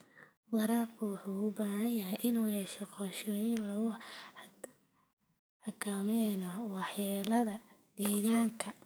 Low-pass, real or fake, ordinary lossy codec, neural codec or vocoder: none; fake; none; codec, 44.1 kHz, 2.6 kbps, SNAC